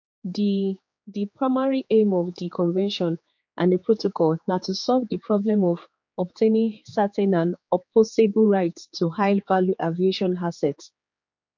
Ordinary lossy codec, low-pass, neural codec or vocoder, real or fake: MP3, 48 kbps; 7.2 kHz; codec, 16 kHz, 4 kbps, X-Codec, HuBERT features, trained on general audio; fake